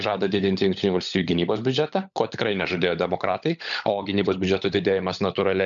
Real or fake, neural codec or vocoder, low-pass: fake; codec, 16 kHz, 16 kbps, FunCodec, trained on LibriTTS, 50 frames a second; 7.2 kHz